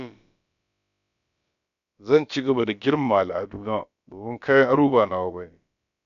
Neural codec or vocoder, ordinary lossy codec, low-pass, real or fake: codec, 16 kHz, about 1 kbps, DyCAST, with the encoder's durations; none; 7.2 kHz; fake